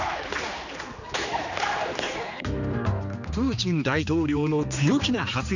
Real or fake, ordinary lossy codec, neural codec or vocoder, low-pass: fake; none; codec, 16 kHz, 2 kbps, X-Codec, HuBERT features, trained on general audio; 7.2 kHz